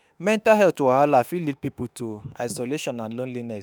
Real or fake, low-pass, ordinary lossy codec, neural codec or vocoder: fake; none; none; autoencoder, 48 kHz, 32 numbers a frame, DAC-VAE, trained on Japanese speech